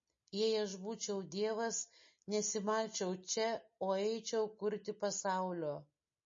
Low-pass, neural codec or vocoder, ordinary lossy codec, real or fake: 7.2 kHz; none; MP3, 32 kbps; real